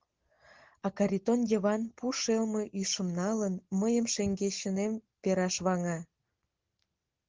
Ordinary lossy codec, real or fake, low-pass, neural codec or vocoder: Opus, 16 kbps; real; 7.2 kHz; none